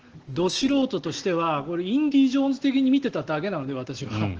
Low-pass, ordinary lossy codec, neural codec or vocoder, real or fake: 7.2 kHz; Opus, 16 kbps; none; real